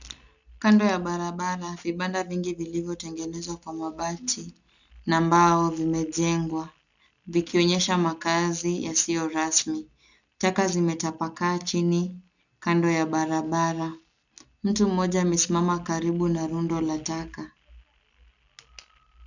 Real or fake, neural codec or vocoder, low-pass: real; none; 7.2 kHz